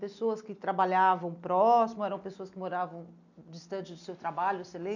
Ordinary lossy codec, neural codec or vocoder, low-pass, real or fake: none; none; 7.2 kHz; real